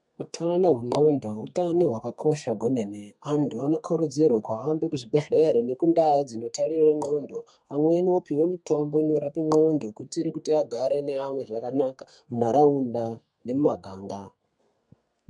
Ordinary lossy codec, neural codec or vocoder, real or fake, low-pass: MP3, 64 kbps; codec, 32 kHz, 1.9 kbps, SNAC; fake; 10.8 kHz